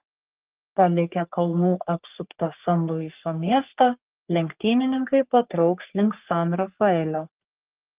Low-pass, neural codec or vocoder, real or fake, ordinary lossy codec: 3.6 kHz; codec, 44.1 kHz, 3.4 kbps, Pupu-Codec; fake; Opus, 32 kbps